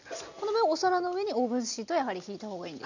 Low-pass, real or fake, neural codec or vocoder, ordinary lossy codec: 7.2 kHz; real; none; none